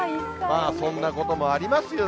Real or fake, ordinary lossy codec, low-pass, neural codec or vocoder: real; none; none; none